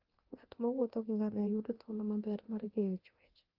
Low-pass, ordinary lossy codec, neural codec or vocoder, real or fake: 5.4 kHz; Opus, 16 kbps; codec, 24 kHz, 0.9 kbps, DualCodec; fake